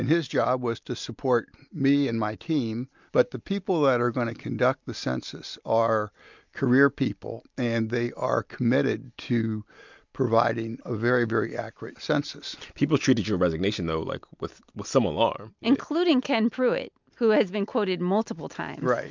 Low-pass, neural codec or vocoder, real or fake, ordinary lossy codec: 7.2 kHz; none; real; MP3, 64 kbps